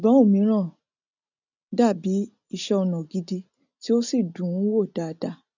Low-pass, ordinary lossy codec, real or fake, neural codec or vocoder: 7.2 kHz; none; real; none